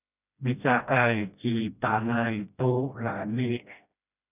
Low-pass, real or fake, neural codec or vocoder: 3.6 kHz; fake; codec, 16 kHz, 1 kbps, FreqCodec, smaller model